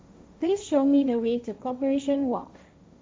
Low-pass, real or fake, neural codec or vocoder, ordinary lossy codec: none; fake; codec, 16 kHz, 1.1 kbps, Voila-Tokenizer; none